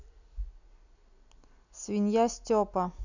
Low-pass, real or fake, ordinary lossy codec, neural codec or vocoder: 7.2 kHz; real; none; none